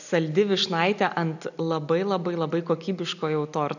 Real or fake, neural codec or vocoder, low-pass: real; none; 7.2 kHz